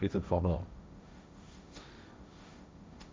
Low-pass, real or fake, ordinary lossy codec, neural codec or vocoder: none; fake; none; codec, 16 kHz, 1.1 kbps, Voila-Tokenizer